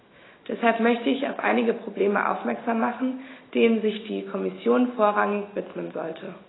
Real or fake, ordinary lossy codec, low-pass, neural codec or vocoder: real; AAC, 16 kbps; 7.2 kHz; none